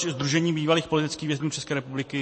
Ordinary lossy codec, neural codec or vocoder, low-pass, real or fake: MP3, 32 kbps; vocoder, 44.1 kHz, 128 mel bands every 256 samples, BigVGAN v2; 10.8 kHz; fake